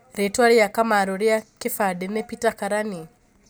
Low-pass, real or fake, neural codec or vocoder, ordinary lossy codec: none; real; none; none